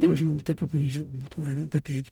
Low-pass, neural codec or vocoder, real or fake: 19.8 kHz; codec, 44.1 kHz, 0.9 kbps, DAC; fake